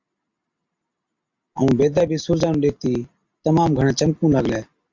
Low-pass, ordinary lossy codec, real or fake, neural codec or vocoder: 7.2 kHz; MP3, 64 kbps; real; none